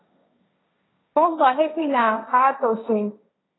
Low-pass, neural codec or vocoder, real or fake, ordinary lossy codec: 7.2 kHz; codec, 16 kHz, 1.1 kbps, Voila-Tokenizer; fake; AAC, 16 kbps